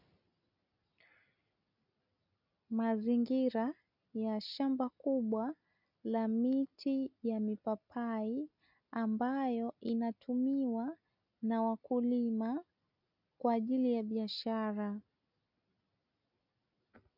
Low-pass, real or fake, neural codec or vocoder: 5.4 kHz; real; none